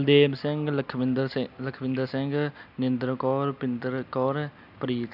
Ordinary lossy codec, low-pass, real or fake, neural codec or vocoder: AAC, 48 kbps; 5.4 kHz; real; none